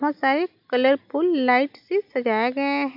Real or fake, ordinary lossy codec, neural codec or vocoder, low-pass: real; none; none; 5.4 kHz